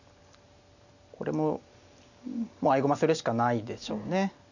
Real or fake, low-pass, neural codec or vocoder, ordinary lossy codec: real; 7.2 kHz; none; none